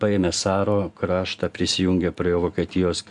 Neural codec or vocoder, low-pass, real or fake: none; 10.8 kHz; real